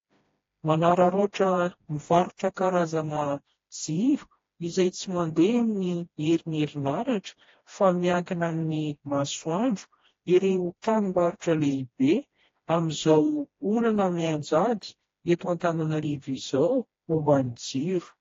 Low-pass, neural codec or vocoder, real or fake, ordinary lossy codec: 7.2 kHz; codec, 16 kHz, 1 kbps, FreqCodec, smaller model; fake; AAC, 32 kbps